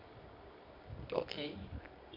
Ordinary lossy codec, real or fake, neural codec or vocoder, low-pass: none; fake; codec, 24 kHz, 0.9 kbps, WavTokenizer, medium music audio release; 5.4 kHz